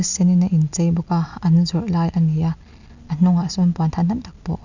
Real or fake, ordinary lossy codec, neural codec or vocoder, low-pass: real; none; none; 7.2 kHz